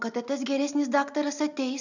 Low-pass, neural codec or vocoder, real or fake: 7.2 kHz; none; real